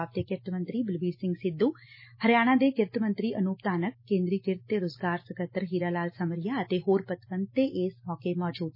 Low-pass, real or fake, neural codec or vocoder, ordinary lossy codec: 5.4 kHz; real; none; MP3, 24 kbps